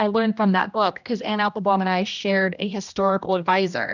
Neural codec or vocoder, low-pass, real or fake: codec, 16 kHz, 1 kbps, X-Codec, HuBERT features, trained on general audio; 7.2 kHz; fake